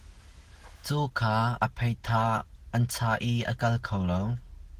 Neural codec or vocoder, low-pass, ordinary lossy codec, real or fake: none; 14.4 kHz; Opus, 16 kbps; real